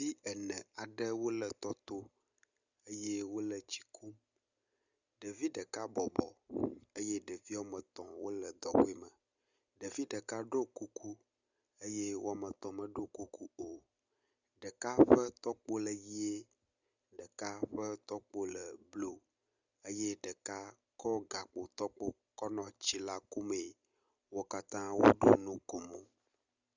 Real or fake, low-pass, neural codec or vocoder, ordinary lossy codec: real; 7.2 kHz; none; Opus, 64 kbps